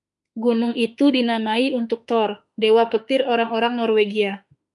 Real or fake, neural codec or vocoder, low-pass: fake; autoencoder, 48 kHz, 32 numbers a frame, DAC-VAE, trained on Japanese speech; 10.8 kHz